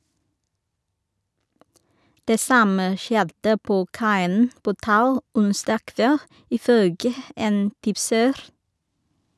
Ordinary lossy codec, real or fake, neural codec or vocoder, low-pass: none; real; none; none